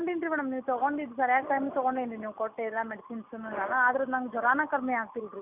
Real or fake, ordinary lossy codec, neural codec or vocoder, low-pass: real; none; none; 3.6 kHz